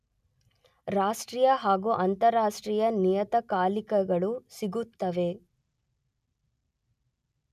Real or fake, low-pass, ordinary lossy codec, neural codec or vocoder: real; 14.4 kHz; none; none